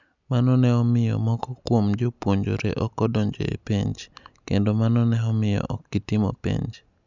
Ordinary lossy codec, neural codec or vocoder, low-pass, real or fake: none; none; 7.2 kHz; real